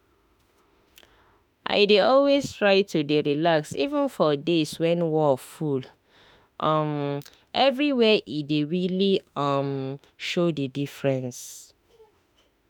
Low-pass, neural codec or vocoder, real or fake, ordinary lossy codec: none; autoencoder, 48 kHz, 32 numbers a frame, DAC-VAE, trained on Japanese speech; fake; none